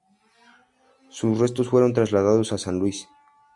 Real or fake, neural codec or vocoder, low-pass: real; none; 10.8 kHz